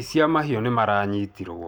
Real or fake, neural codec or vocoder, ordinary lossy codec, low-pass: real; none; none; none